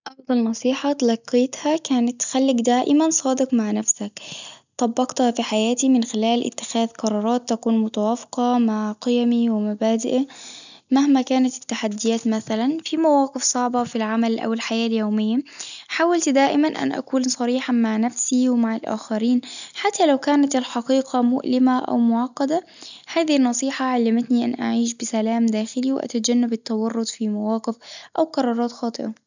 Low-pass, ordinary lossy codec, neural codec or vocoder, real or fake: 7.2 kHz; none; none; real